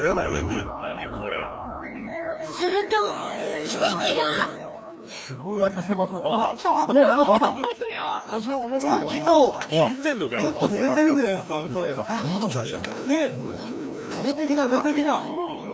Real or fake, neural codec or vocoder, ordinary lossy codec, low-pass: fake; codec, 16 kHz, 1 kbps, FreqCodec, larger model; none; none